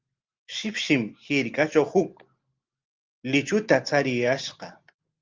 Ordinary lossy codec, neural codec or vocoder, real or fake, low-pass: Opus, 24 kbps; none; real; 7.2 kHz